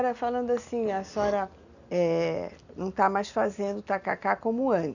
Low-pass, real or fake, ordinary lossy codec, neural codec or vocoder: 7.2 kHz; real; none; none